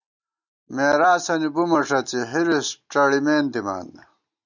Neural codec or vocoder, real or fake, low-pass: none; real; 7.2 kHz